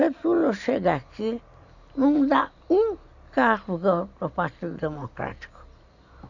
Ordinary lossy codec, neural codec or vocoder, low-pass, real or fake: none; none; 7.2 kHz; real